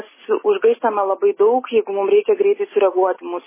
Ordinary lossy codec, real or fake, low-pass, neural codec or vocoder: MP3, 16 kbps; real; 3.6 kHz; none